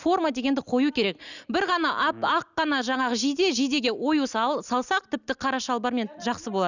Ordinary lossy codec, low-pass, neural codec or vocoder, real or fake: none; 7.2 kHz; none; real